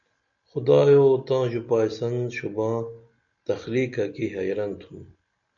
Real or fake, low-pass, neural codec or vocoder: real; 7.2 kHz; none